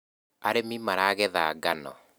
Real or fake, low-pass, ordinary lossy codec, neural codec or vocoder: real; none; none; none